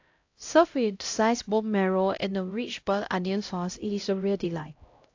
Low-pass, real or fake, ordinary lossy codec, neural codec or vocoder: 7.2 kHz; fake; AAC, 48 kbps; codec, 16 kHz, 0.5 kbps, X-Codec, HuBERT features, trained on LibriSpeech